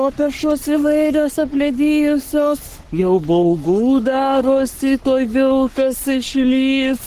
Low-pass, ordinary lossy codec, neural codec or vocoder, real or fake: 14.4 kHz; Opus, 16 kbps; codec, 44.1 kHz, 3.4 kbps, Pupu-Codec; fake